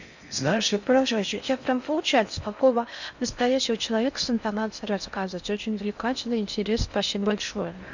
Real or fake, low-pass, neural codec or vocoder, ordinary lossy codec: fake; 7.2 kHz; codec, 16 kHz in and 24 kHz out, 0.6 kbps, FocalCodec, streaming, 4096 codes; none